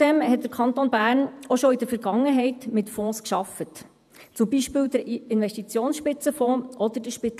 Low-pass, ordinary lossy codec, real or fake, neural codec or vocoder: 14.4 kHz; none; fake; vocoder, 48 kHz, 128 mel bands, Vocos